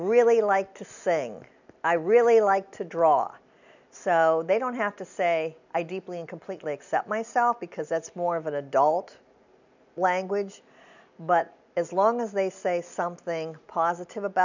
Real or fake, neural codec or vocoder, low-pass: real; none; 7.2 kHz